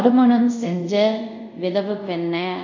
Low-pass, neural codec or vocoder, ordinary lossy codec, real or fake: 7.2 kHz; codec, 24 kHz, 0.5 kbps, DualCodec; MP3, 48 kbps; fake